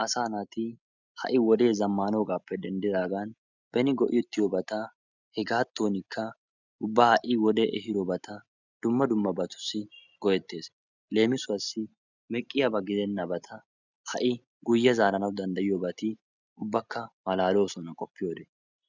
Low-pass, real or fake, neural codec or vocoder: 7.2 kHz; real; none